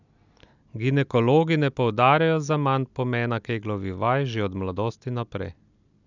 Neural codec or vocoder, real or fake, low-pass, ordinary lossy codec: none; real; 7.2 kHz; none